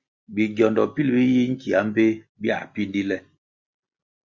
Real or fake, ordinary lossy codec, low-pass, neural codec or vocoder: real; Opus, 64 kbps; 7.2 kHz; none